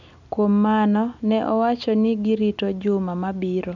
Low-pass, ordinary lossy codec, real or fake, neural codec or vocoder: 7.2 kHz; none; real; none